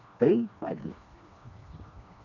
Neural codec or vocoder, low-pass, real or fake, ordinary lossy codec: codec, 16 kHz, 2 kbps, FreqCodec, smaller model; 7.2 kHz; fake; none